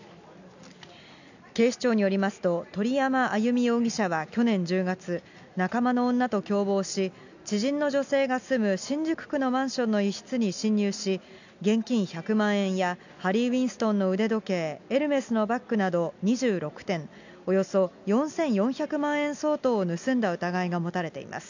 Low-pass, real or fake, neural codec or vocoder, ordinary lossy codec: 7.2 kHz; real; none; none